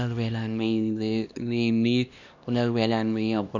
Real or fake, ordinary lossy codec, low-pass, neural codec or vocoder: fake; none; 7.2 kHz; codec, 16 kHz, 1 kbps, X-Codec, HuBERT features, trained on LibriSpeech